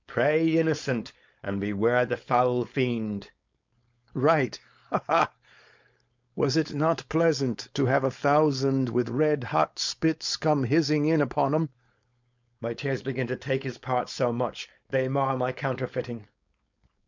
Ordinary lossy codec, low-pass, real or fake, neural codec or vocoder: MP3, 64 kbps; 7.2 kHz; fake; codec, 16 kHz, 4.8 kbps, FACodec